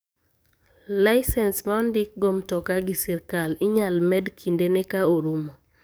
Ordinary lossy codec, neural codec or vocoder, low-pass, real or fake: none; codec, 44.1 kHz, 7.8 kbps, DAC; none; fake